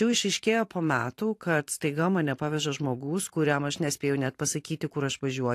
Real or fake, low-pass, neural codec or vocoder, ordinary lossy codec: fake; 14.4 kHz; vocoder, 44.1 kHz, 128 mel bands every 512 samples, BigVGAN v2; AAC, 64 kbps